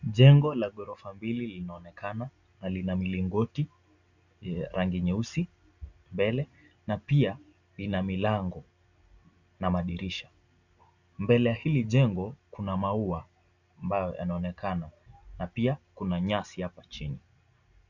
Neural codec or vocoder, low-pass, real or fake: none; 7.2 kHz; real